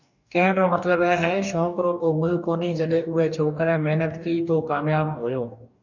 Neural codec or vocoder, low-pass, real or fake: codec, 44.1 kHz, 2.6 kbps, DAC; 7.2 kHz; fake